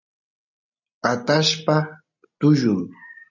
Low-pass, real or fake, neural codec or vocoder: 7.2 kHz; real; none